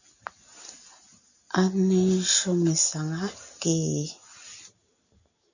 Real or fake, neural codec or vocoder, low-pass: real; none; 7.2 kHz